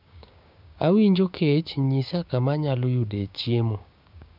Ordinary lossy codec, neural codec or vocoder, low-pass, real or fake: none; codec, 16 kHz, 6 kbps, DAC; 5.4 kHz; fake